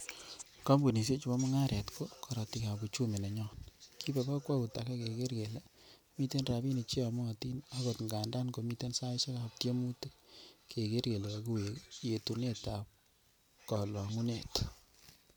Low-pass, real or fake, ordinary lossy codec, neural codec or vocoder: none; real; none; none